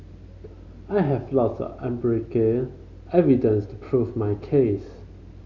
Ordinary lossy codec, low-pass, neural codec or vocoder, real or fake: Opus, 64 kbps; 7.2 kHz; none; real